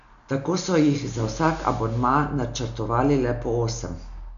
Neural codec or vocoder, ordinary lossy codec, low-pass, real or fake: none; none; 7.2 kHz; real